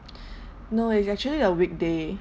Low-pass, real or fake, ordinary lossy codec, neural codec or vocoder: none; real; none; none